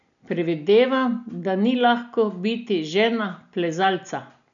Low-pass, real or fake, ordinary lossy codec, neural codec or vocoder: 7.2 kHz; real; none; none